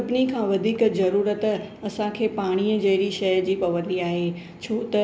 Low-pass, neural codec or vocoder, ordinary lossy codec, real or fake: none; none; none; real